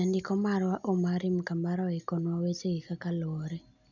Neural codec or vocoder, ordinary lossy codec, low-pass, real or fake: none; none; 7.2 kHz; real